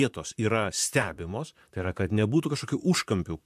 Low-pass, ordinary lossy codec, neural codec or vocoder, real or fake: 14.4 kHz; MP3, 96 kbps; vocoder, 44.1 kHz, 128 mel bands, Pupu-Vocoder; fake